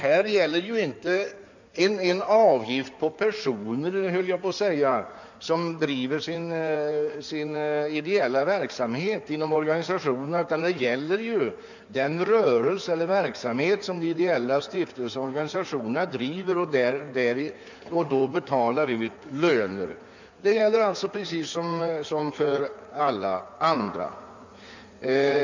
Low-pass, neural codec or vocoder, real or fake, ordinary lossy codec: 7.2 kHz; codec, 16 kHz in and 24 kHz out, 2.2 kbps, FireRedTTS-2 codec; fake; none